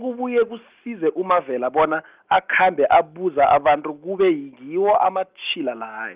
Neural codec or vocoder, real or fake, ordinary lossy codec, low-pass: none; real; Opus, 32 kbps; 3.6 kHz